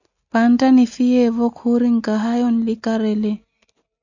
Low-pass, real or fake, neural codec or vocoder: 7.2 kHz; real; none